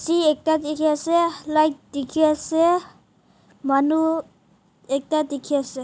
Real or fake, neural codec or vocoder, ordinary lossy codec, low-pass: real; none; none; none